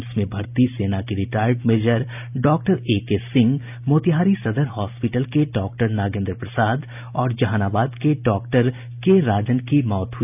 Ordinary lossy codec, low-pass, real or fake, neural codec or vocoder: none; 3.6 kHz; real; none